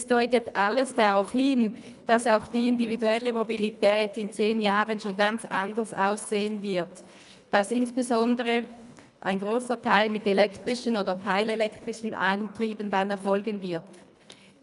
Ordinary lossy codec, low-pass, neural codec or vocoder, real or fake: none; 10.8 kHz; codec, 24 kHz, 1.5 kbps, HILCodec; fake